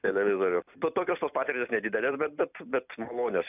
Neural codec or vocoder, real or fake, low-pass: vocoder, 44.1 kHz, 128 mel bands every 256 samples, BigVGAN v2; fake; 3.6 kHz